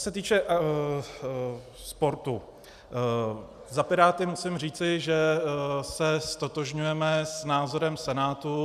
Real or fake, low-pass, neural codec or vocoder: real; 14.4 kHz; none